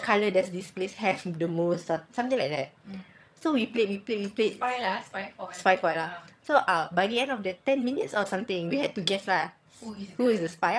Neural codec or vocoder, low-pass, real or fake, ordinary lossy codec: vocoder, 22.05 kHz, 80 mel bands, HiFi-GAN; none; fake; none